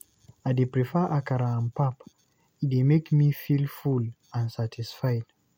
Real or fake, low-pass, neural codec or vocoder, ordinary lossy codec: real; 19.8 kHz; none; MP3, 64 kbps